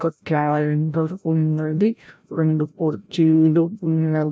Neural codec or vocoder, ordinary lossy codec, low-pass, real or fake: codec, 16 kHz, 0.5 kbps, FreqCodec, larger model; none; none; fake